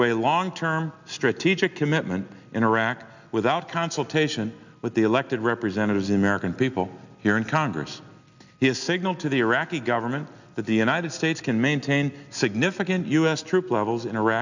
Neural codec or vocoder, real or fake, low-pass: none; real; 7.2 kHz